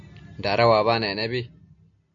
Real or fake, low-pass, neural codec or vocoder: real; 7.2 kHz; none